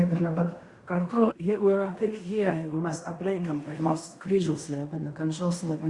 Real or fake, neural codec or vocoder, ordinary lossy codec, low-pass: fake; codec, 16 kHz in and 24 kHz out, 0.9 kbps, LongCat-Audio-Codec, fine tuned four codebook decoder; Opus, 64 kbps; 10.8 kHz